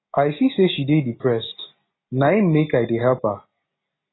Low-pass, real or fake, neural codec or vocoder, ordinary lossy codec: 7.2 kHz; real; none; AAC, 16 kbps